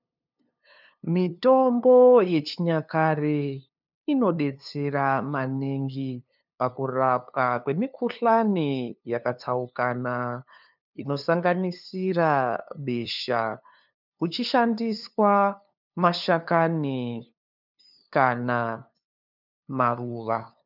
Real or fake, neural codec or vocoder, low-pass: fake; codec, 16 kHz, 2 kbps, FunCodec, trained on LibriTTS, 25 frames a second; 5.4 kHz